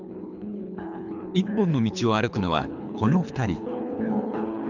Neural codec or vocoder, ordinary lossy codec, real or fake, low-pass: codec, 24 kHz, 3 kbps, HILCodec; none; fake; 7.2 kHz